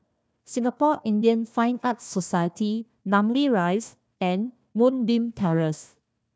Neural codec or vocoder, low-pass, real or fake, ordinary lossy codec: codec, 16 kHz, 1 kbps, FunCodec, trained on Chinese and English, 50 frames a second; none; fake; none